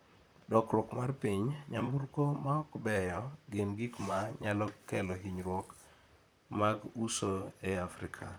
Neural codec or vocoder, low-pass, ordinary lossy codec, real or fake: vocoder, 44.1 kHz, 128 mel bands, Pupu-Vocoder; none; none; fake